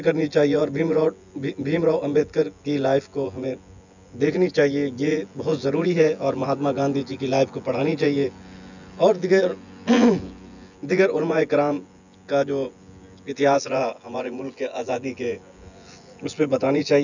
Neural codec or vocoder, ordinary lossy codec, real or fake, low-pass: vocoder, 24 kHz, 100 mel bands, Vocos; none; fake; 7.2 kHz